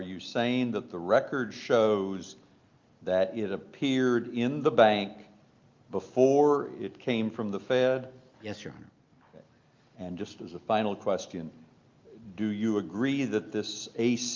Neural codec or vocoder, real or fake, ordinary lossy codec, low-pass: none; real; Opus, 24 kbps; 7.2 kHz